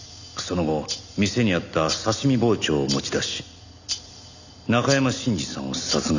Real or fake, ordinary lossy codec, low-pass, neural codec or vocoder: real; none; 7.2 kHz; none